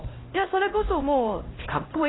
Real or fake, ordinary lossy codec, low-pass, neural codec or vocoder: fake; AAC, 16 kbps; 7.2 kHz; codec, 16 kHz, 1 kbps, X-Codec, HuBERT features, trained on LibriSpeech